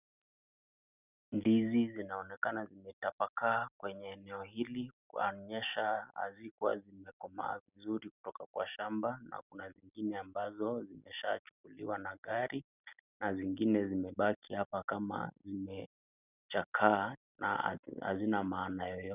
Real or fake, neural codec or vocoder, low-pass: real; none; 3.6 kHz